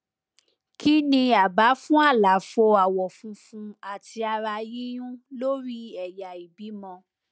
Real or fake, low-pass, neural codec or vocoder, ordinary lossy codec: real; none; none; none